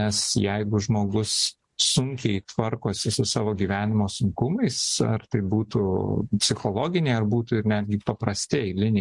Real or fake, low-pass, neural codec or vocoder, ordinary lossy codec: real; 10.8 kHz; none; MP3, 64 kbps